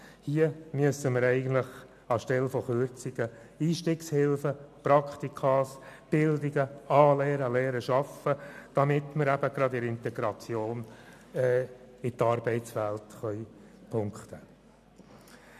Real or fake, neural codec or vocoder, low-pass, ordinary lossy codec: real; none; 14.4 kHz; none